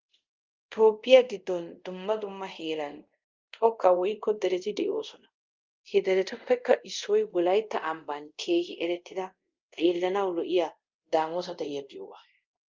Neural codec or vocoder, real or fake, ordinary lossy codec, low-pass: codec, 24 kHz, 0.5 kbps, DualCodec; fake; Opus, 24 kbps; 7.2 kHz